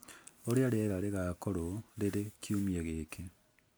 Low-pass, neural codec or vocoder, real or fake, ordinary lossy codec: none; none; real; none